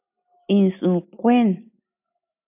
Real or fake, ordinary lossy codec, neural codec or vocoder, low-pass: real; AAC, 32 kbps; none; 3.6 kHz